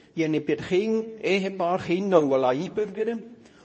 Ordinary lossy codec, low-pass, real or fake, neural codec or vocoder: MP3, 32 kbps; 9.9 kHz; fake; codec, 24 kHz, 0.9 kbps, WavTokenizer, medium speech release version 2